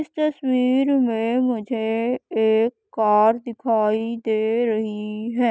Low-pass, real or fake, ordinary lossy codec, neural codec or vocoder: none; real; none; none